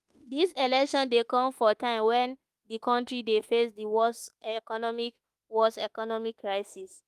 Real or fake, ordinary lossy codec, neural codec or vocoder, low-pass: fake; Opus, 32 kbps; autoencoder, 48 kHz, 32 numbers a frame, DAC-VAE, trained on Japanese speech; 14.4 kHz